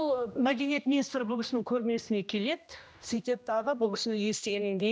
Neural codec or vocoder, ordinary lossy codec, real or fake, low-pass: codec, 16 kHz, 1 kbps, X-Codec, HuBERT features, trained on general audio; none; fake; none